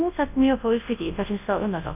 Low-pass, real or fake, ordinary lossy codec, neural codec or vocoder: 3.6 kHz; fake; none; codec, 24 kHz, 0.9 kbps, WavTokenizer, large speech release